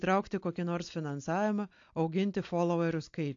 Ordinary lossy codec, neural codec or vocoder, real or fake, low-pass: AAC, 48 kbps; none; real; 7.2 kHz